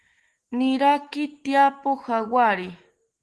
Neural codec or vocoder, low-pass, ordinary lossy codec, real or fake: autoencoder, 48 kHz, 128 numbers a frame, DAC-VAE, trained on Japanese speech; 10.8 kHz; Opus, 16 kbps; fake